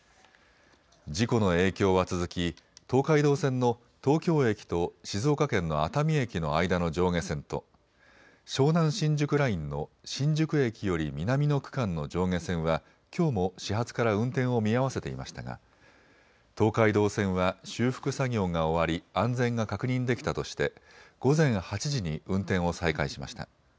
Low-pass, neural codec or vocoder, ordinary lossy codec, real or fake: none; none; none; real